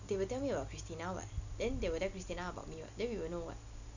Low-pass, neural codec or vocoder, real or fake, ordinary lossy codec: 7.2 kHz; none; real; none